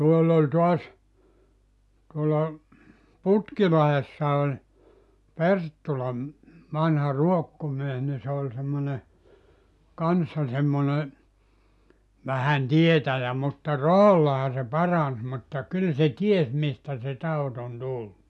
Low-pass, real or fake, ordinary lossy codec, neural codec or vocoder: none; real; none; none